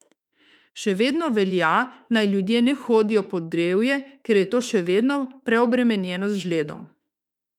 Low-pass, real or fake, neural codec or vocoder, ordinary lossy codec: 19.8 kHz; fake; autoencoder, 48 kHz, 32 numbers a frame, DAC-VAE, trained on Japanese speech; none